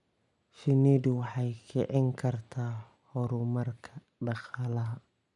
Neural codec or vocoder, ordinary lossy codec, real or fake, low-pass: none; none; real; 10.8 kHz